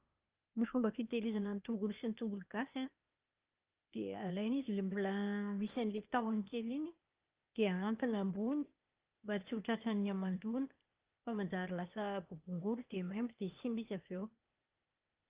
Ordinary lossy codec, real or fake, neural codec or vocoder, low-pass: Opus, 24 kbps; fake; codec, 16 kHz, 0.8 kbps, ZipCodec; 3.6 kHz